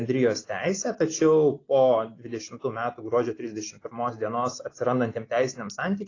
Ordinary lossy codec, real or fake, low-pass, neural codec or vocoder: AAC, 32 kbps; real; 7.2 kHz; none